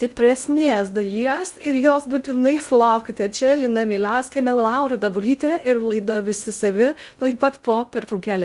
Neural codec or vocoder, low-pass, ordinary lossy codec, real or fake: codec, 16 kHz in and 24 kHz out, 0.6 kbps, FocalCodec, streaming, 2048 codes; 10.8 kHz; Opus, 64 kbps; fake